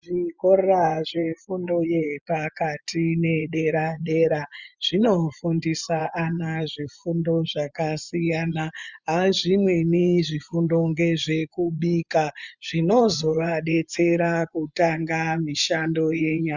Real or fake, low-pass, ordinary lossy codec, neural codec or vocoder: fake; 7.2 kHz; Opus, 64 kbps; vocoder, 24 kHz, 100 mel bands, Vocos